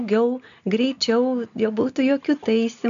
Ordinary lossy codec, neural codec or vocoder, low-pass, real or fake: AAC, 96 kbps; none; 7.2 kHz; real